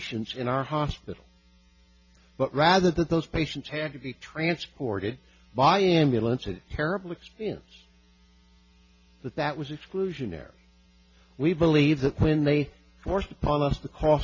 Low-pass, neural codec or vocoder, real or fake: 7.2 kHz; none; real